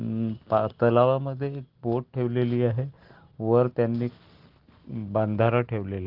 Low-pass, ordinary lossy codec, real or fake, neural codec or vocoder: 5.4 kHz; Opus, 16 kbps; real; none